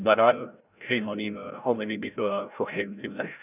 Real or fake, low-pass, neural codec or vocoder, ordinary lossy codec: fake; 3.6 kHz; codec, 16 kHz, 0.5 kbps, FreqCodec, larger model; none